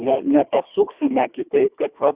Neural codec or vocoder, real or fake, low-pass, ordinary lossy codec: codec, 24 kHz, 1.5 kbps, HILCodec; fake; 3.6 kHz; Opus, 64 kbps